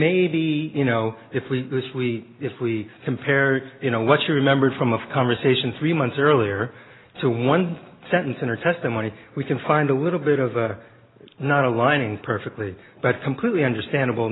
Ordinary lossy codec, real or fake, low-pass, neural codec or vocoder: AAC, 16 kbps; real; 7.2 kHz; none